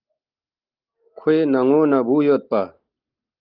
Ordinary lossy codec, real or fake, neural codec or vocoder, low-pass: Opus, 24 kbps; real; none; 5.4 kHz